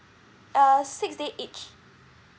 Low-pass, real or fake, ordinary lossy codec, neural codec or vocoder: none; real; none; none